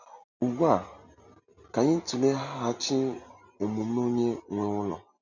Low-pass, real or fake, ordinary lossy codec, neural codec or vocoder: 7.2 kHz; real; none; none